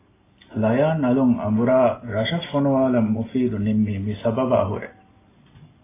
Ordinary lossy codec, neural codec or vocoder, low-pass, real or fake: AAC, 16 kbps; none; 3.6 kHz; real